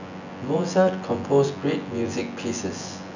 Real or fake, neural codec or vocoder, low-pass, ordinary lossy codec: fake; vocoder, 24 kHz, 100 mel bands, Vocos; 7.2 kHz; none